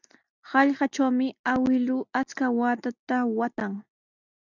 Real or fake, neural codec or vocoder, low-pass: real; none; 7.2 kHz